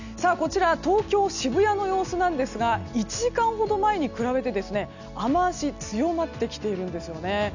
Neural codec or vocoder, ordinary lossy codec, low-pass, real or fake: none; none; 7.2 kHz; real